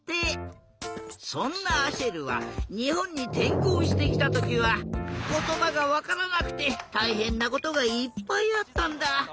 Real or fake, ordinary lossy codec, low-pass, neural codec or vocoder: real; none; none; none